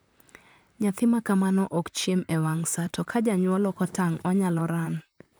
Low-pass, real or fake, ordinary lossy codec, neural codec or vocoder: none; fake; none; vocoder, 44.1 kHz, 128 mel bands, Pupu-Vocoder